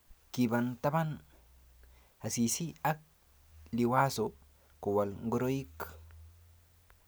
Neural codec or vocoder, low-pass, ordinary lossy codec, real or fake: none; none; none; real